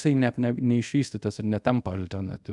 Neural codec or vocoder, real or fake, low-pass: codec, 24 kHz, 0.5 kbps, DualCodec; fake; 10.8 kHz